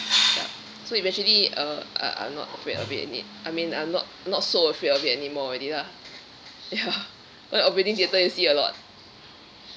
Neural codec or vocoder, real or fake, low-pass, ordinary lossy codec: none; real; none; none